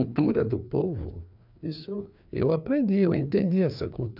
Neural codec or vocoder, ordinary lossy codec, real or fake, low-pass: codec, 16 kHz, 2 kbps, FreqCodec, larger model; none; fake; 5.4 kHz